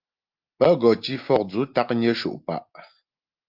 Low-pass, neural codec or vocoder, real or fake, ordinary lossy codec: 5.4 kHz; none; real; Opus, 32 kbps